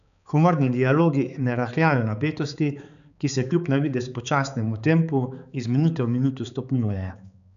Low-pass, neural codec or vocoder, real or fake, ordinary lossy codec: 7.2 kHz; codec, 16 kHz, 4 kbps, X-Codec, HuBERT features, trained on balanced general audio; fake; none